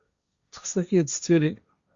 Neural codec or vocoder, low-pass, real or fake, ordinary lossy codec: codec, 16 kHz, 1.1 kbps, Voila-Tokenizer; 7.2 kHz; fake; Opus, 64 kbps